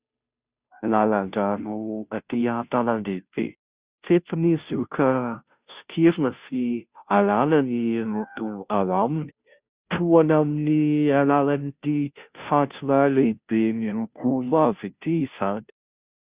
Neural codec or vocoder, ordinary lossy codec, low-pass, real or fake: codec, 16 kHz, 0.5 kbps, FunCodec, trained on Chinese and English, 25 frames a second; Opus, 64 kbps; 3.6 kHz; fake